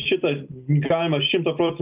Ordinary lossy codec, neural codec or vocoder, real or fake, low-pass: Opus, 24 kbps; none; real; 3.6 kHz